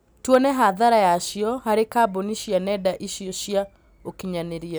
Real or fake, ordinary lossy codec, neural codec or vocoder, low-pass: real; none; none; none